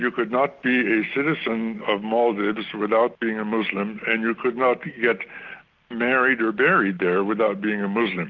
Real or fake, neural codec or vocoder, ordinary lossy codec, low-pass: real; none; Opus, 32 kbps; 7.2 kHz